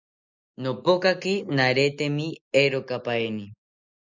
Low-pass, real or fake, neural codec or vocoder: 7.2 kHz; real; none